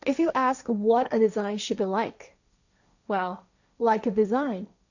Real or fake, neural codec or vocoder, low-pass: fake; codec, 16 kHz, 1.1 kbps, Voila-Tokenizer; 7.2 kHz